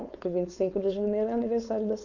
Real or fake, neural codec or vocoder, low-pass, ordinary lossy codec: fake; codec, 16 kHz, 4.8 kbps, FACodec; 7.2 kHz; none